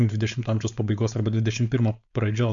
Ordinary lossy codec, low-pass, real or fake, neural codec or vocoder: MP3, 64 kbps; 7.2 kHz; fake; codec, 16 kHz, 4.8 kbps, FACodec